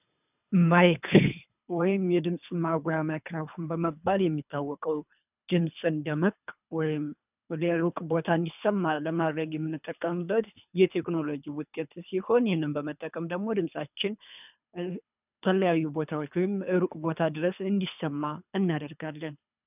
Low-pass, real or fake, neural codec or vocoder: 3.6 kHz; fake; codec, 24 kHz, 3 kbps, HILCodec